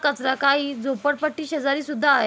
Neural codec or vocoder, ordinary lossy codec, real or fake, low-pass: none; none; real; none